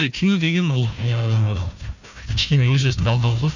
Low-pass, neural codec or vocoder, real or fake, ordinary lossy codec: 7.2 kHz; codec, 16 kHz, 1 kbps, FunCodec, trained on Chinese and English, 50 frames a second; fake; none